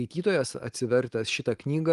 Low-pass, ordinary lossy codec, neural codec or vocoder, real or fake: 10.8 kHz; Opus, 24 kbps; none; real